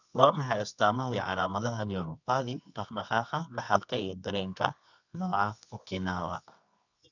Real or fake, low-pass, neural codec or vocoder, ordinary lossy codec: fake; 7.2 kHz; codec, 24 kHz, 0.9 kbps, WavTokenizer, medium music audio release; none